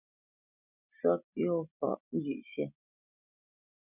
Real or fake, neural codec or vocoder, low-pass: real; none; 3.6 kHz